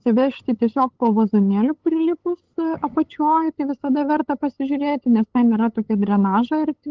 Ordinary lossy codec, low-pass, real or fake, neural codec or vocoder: Opus, 16 kbps; 7.2 kHz; fake; codec, 16 kHz, 8 kbps, FunCodec, trained on LibriTTS, 25 frames a second